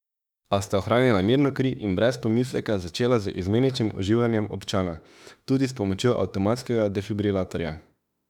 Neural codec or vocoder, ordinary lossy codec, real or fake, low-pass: autoencoder, 48 kHz, 32 numbers a frame, DAC-VAE, trained on Japanese speech; none; fake; 19.8 kHz